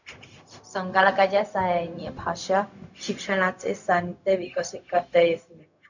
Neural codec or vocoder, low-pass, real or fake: codec, 16 kHz, 0.4 kbps, LongCat-Audio-Codec; 7.2 kHz; fake